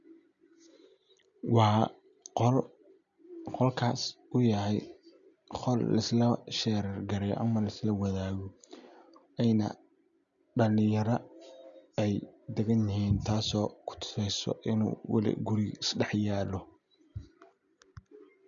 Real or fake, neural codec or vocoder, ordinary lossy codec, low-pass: real; none; none; 7.2 kHz